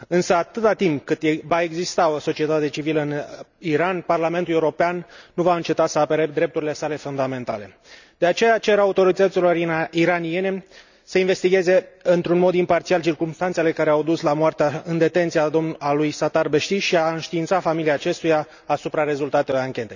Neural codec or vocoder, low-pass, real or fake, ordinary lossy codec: none; 7.2 kHz; real; none